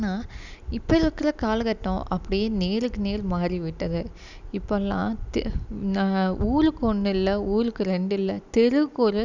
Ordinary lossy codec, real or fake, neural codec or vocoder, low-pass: none; real; none; 7.2 kHz